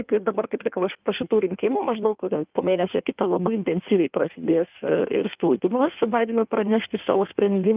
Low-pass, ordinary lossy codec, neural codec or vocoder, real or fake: 3.6 kHz; Opus, 24 kbps; codec, 16 kHz in and 24 kHz out, 1.1 kbps, FireRedTTS-2 codec; fake